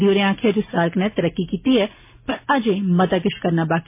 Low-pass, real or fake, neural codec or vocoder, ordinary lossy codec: 3.6 kHz; real; none; MP3, 24 kbps